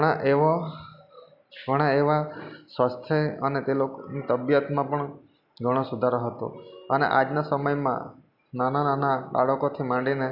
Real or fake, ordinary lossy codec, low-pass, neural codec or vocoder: real; none; 5.4 kHz; none